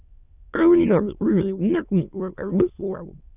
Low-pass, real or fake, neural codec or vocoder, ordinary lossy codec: 3.6 kHz; fake; autoencoder, 22.05 kHz, a latent of 192 numbers a frame, VITS, trained on many speakers; Opus, 64 kbps